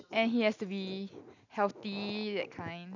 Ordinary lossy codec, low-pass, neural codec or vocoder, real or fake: none; 7.2 kHz; none; real